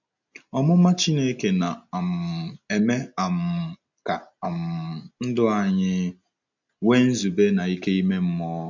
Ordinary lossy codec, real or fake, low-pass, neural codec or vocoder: none; real; 7.2 kHz; none